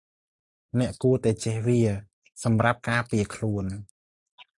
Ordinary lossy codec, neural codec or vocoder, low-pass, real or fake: AAC, 64 kbps; none; 10.8 kHz; real